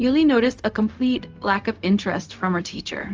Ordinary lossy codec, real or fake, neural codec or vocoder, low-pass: Opus, 24 kbps; fake; codec, 16 kHz, 0.4 kbps, LongCat-Audio-Codec; 7.2 kHz